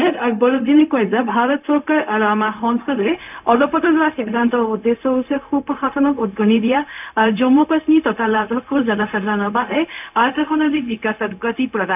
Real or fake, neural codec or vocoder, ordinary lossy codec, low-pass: fake; codec, 16 kHz, 0.4 kbps, LongCat-Audio-Codec; none; 3.6 kHz